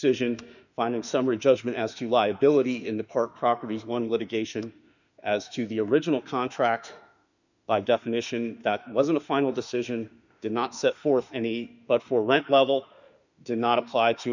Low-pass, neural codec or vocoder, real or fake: 7.2 kHz; autoencoder, 48 kHz, 32 numbers a frame, DAC-VAE, trained on Japanese speech; fake